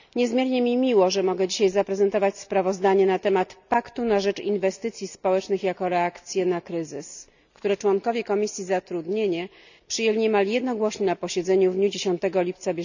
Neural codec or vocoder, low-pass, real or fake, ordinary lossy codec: none; 7.2 kHz; real; none